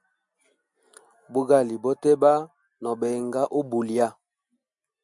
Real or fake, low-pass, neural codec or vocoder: real; 10.8 kHz; none